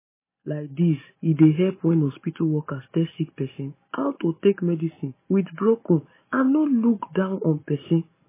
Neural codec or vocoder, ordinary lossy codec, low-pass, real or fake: none; MP3, 16 kbps; 3.6 kHz; real